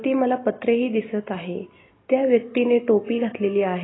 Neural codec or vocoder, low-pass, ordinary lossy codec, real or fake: none; 7.2 kHz; AAC, 16 kbps; real